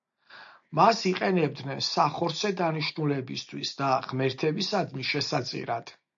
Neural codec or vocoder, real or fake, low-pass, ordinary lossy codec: none; real; 7.2 kHz; AAC, 64 kbps